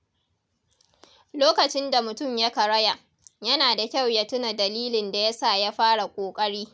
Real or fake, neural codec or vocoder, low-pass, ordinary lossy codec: real; none; none; none